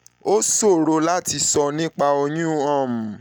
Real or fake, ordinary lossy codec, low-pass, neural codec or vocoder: real; none; none; none